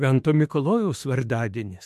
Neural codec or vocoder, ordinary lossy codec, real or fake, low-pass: autoencoder, 48 kHz, 32 numbers a frame, DAC-VAE, trained on Japanese speech; MP3, 64 kbps; fake; 14.4 kHz